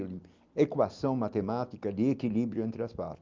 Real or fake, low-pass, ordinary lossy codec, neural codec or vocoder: real; 7.2 kHz; Opus, 24 kbps; none